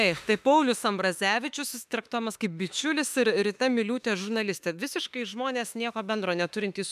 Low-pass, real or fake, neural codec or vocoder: 14.4 kHz; fake; autoencoder, 48 kHz, 32 numbers a frame, DAC-VAE, trained on Japanese speech